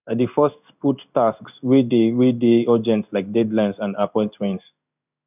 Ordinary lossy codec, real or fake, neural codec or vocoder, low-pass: none; fake; codec, 16 kHz in and 24 kHz out, 1 kbps, XY-Tokenizer; 3.6 kHz